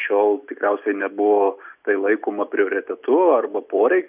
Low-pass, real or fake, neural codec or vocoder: 3.6 kHz; real; none